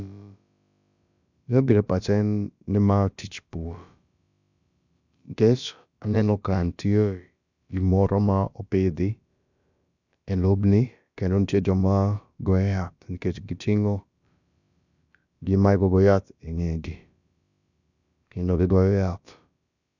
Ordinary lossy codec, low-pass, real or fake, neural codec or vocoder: none; 7.2 kHz; fake; codec, 16 kHz, about 1 kbps, DyCAST, with the encoder's durations